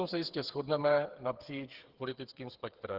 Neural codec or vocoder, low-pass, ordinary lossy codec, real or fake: codec, 16 kHz, 8 kbps, FreqCodec, smaller model; 5.4 kHz; Opus, 16 kbps; fake